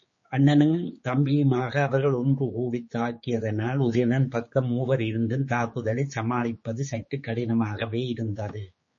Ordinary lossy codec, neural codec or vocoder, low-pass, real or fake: MP3, 32 kbps; codec, 16 kHz, 4 kbps, X-Codec, HuBERT features, trained on general audio; 7.2 kHz; fake